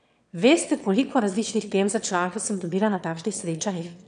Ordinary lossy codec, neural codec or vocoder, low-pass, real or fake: none; autoencoder, 22.05 kHz, a latent of 192 numbers a frame, VITS, trained on one speaker; 9.9 kHz; fake